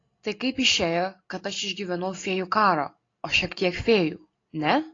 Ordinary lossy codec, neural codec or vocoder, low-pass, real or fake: AAC, 32 kbps; none; 7.2 kHz; real